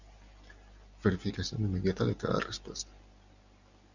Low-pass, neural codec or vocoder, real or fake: 7.2 kHz; none; real